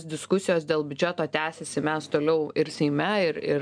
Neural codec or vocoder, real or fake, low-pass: none; real; 9.9 kHz